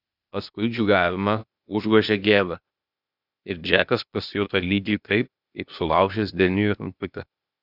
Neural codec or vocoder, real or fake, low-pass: codec, 16 kHz, 0.8 kbps, ZipCodec; fake; 5.4 kHz